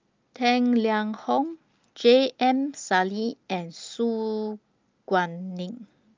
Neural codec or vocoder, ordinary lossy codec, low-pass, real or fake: none; Opus, 24 kbps; 7.2 kHz; real